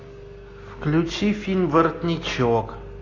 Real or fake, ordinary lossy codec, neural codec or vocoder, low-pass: real; AAC, 32 kbps; none; 7.2 kHz